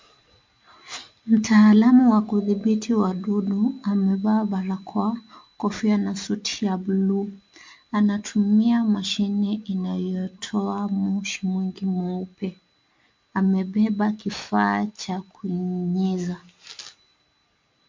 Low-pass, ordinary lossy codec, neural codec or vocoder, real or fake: 7.2 kHz; MP3, 48 kbps; none; real